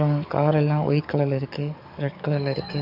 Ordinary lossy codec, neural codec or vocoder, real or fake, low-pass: none; codec, 44.1 kHz, 7.8 kbps, DAC; fake; 5.4 kHz